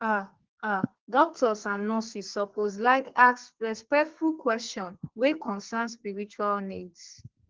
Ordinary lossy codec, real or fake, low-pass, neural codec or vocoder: Opus, 16 kbps; fake; 7.2 kHz; codec, 32 kHz, 1.9 kbps, SNAC